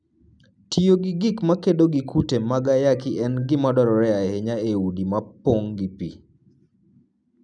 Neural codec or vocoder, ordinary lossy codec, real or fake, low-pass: none; none; real; 9.9 kHz